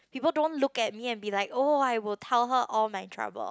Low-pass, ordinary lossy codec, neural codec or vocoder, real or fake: none; none; none; real